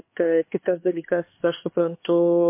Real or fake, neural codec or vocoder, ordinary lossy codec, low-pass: fake; codec, 16 kHz, 2 kbps, X-Codec, HuBERT features, trained on LibriSpeech; MP3, 24 kbps; 3.6 kHz